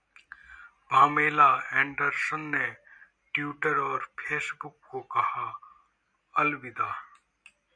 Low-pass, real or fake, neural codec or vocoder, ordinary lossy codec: 9.9 kHz; real; none; MP3, 96 kbps